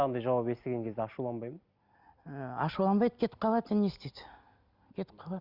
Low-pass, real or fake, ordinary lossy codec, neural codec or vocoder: 5.4 kHz; real; Opus, 64 kbps; none